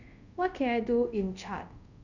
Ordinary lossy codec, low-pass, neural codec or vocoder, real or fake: none; 7.2 kHz; codec, 24 kHz, 0.5 kbps, DualCodec; fake